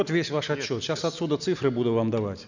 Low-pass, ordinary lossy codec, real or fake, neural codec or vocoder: 7.2 kHz; none; real; none